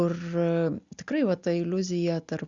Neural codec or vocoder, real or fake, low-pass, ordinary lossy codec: none; real; 7.2 kHz; Opus, 64 kbps